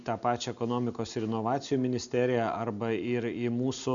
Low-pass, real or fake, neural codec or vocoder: 7.2 kHz; real; none